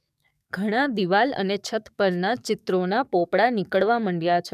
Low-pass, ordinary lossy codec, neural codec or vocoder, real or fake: 14.4 kHz; none; codec, 44.1 kHz, 7.8 kbps, DAC; fake